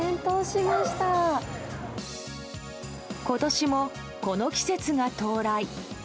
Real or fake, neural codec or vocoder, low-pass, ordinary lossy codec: real; none; none; none